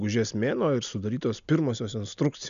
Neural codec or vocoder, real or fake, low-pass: none; real; 7.2 kHz